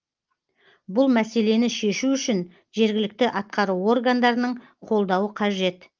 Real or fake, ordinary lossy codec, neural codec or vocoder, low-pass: real; Opus, 32 kbps; none; 7.2 kHz